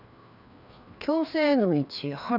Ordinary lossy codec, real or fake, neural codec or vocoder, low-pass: none; fake; codec, 16 kHz, 2 kbps, FreqCodec, larger model; 5.4 kHz